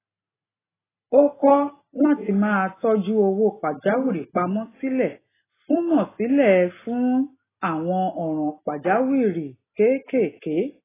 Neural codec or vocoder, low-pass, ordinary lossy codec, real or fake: none; 3.6 kHz; AAC, 16 kbps; real